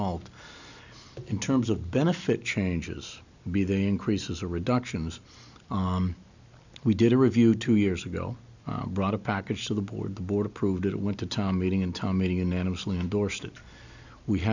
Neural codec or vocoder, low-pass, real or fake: none; 7.2 kHz; real